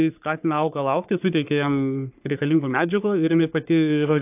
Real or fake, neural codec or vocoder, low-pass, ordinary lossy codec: fake; codec, 44.1 kHz, 3.4 kbps, Pupu-Codec; 3.6 kHz; AAC, 32 kbps